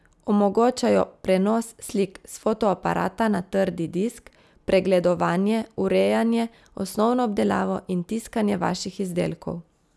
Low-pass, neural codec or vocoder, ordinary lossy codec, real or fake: none; none; none; real